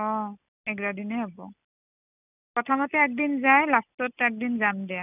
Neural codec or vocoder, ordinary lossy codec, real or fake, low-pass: none; none; real; 3.6 kHz